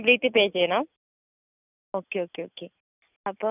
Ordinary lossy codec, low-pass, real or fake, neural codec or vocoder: none; 3.6 kHz; real; none